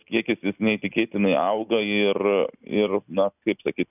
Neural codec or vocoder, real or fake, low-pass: none; real; 3.6 kHz